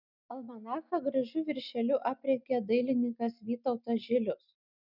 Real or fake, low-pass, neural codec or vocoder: real; 5.4 kHz; none